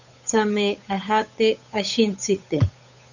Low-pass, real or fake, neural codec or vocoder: 7.2 kHz; fake; codec, 16 kHz, 8 kbps, FunCodec, trained on Chinese and English, 25 frames a second